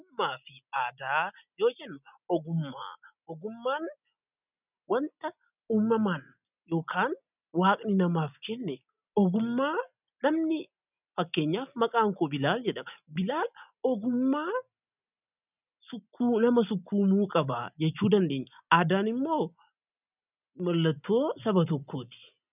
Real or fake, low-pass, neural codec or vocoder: real; 3.6 kHz; none